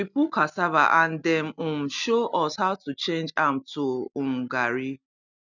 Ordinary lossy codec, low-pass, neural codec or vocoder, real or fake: none; 7.2 kHz; none; real